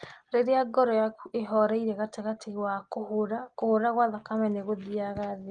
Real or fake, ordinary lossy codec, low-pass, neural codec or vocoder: real; Opus, 24 kbps; 9.9 kHz; none